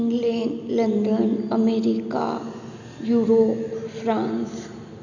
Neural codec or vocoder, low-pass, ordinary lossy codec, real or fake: none; 7.2 kHz; none; real